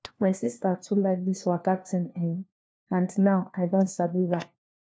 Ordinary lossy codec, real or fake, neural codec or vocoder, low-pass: none; fake; codec, 16 kHz, 1 kbps, FunCodec, trained on LibriTTS, 50 frames a second; none